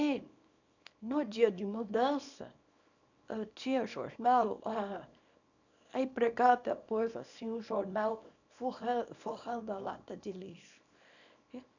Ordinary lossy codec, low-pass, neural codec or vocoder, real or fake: none; 7.2 kHz; codec, 24 kHz, 0.9 kbps, WavTokenizer, small release; fake